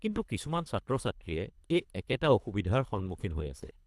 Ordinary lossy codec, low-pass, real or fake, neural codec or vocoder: none; none; fake; codec, 24 kHz, 3 kbps, HILCodec